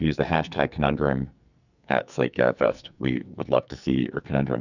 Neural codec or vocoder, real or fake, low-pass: codec, 44.1 kHz, 2.6 kbps, SNAC; fake; 7.2 kHz